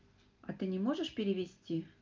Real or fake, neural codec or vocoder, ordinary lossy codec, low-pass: real; none; Opus, 24 kbps; 7.2 kHz